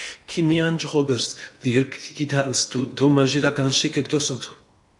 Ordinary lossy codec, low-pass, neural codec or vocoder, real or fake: AAC, 64 kbps; 10.8 kHz; codec, 16 kHz in and 24 kHz out, 0.8 kbps, FocalCodec, streaming, 65536 codes; fake